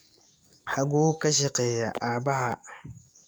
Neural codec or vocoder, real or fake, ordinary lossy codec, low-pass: codec, 44.1 kHz, 7.8 kbps, DAC; fake; none; none